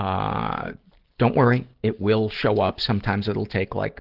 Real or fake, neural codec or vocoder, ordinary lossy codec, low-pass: real; none; Opus, 16 kbps; 5.4 kHz